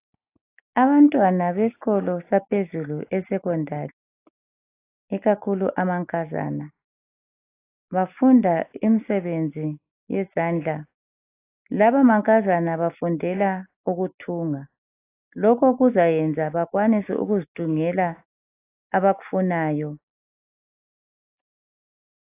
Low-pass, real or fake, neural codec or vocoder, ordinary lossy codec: 3.6 kHz; real; none; AAC, 24 kbps